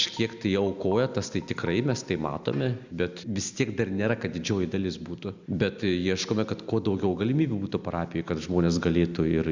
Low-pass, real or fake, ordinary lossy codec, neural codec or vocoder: 7.2 kHz; real; Opus, 64 kbps; none